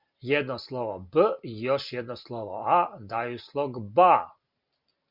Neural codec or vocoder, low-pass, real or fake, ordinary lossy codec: none; 5.4 kHz; real; Opus, 64 kbps